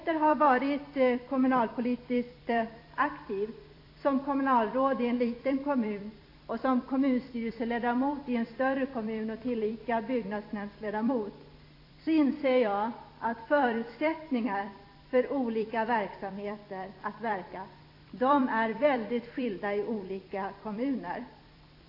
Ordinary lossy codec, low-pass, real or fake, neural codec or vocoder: AAC, 32 kbps; 5.4 kHz; fake; vocoder, 44.1 kHz, 128 mel bands every 256 samples, BigVGAN v2